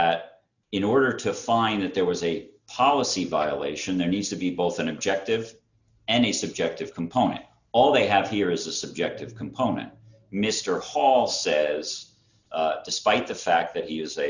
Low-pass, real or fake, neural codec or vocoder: 7.2 kHz; real; none